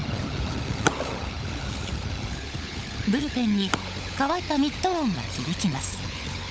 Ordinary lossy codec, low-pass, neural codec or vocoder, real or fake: none; none; codec, 16 kHz, 16 kbps, FunCodec, trained on LibriTTS, 50 frames a second; fake